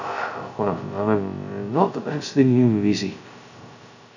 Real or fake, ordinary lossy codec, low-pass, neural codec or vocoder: fake; none; 7.2 kHz; codec, 16 kHz, 0.2 kbps, FocalCodec